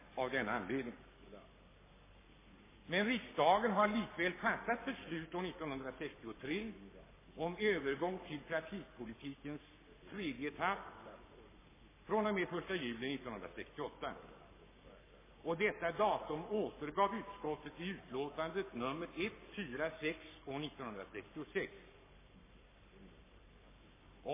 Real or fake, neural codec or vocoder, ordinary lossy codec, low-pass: fake; codec, 44.1 kHz, 7.8 kbps, Pupu-Codec; MP3, 16 kbps; 3.6 kHz